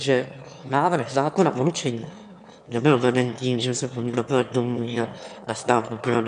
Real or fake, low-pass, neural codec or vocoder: fake; 9.9 kHz; autoencoder, 22.05 kHz, a latent of 192 numbers a frame, VITS, trained on one speaker